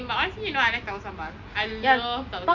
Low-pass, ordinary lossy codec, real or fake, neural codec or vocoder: 7.2 kHz; none; real; none